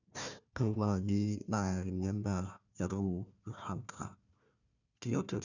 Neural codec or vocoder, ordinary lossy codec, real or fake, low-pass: codec, 16 kHz, 1 kbps, FunCodec, trained on Chinese and English, 50 frames a second; none; fake; 7.2 kHz